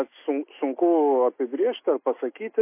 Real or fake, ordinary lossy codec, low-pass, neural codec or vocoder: real; MP3, 24 kbps; 3.6 kHz; none